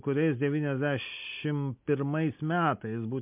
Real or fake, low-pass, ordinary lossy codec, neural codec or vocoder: real; 3.6 kHz; MP3, 32 kbps; none